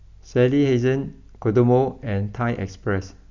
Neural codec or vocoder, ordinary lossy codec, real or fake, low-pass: none; none; real; 7.2 kHz